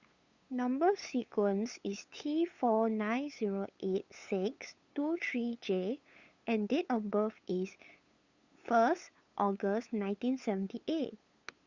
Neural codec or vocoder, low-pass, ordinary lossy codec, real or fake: codec, 16 kHz, 8 kbps, FunCodec, trained on LibriTTS, 25 frames a second; 7.2 kHz; Opus, 64 kbps; fake